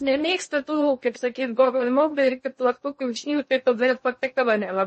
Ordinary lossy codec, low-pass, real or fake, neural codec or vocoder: MP3, 32 kbps; 10.8 kHz; fake; codec, 16 kHz in and 24 kHz out, 0.6 kbps, FocalCodec, streaming, 2048 codes